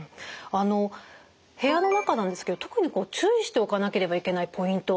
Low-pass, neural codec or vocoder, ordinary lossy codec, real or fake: none; none; none; real